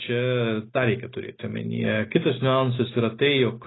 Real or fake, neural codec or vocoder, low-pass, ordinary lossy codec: real; none; 7.2 kHz; AAC, 16 kbps